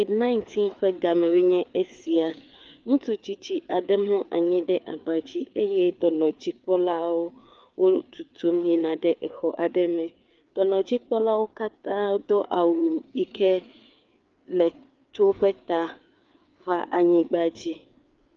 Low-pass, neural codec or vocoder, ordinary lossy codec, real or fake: 7.2 kHz; codec, 16 kHz, 4 kbps, FreqCodec, larger model; Opus, 24 kbps; fake